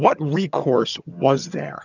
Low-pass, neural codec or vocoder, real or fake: 7.2 kHz; vocoder, 22.05 kHz, 80 mel bands, HiFi-GAN; fake